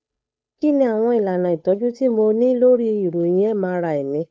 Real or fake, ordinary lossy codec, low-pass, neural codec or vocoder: fake; none; none; codec, 16 kHz, 8 kbps, FunCodec, trained on Chinese and English, 25 frames a second